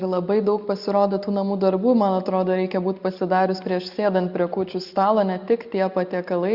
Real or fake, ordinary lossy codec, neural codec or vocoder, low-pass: real; Opus, 64 kbps; none; 5.4 kHz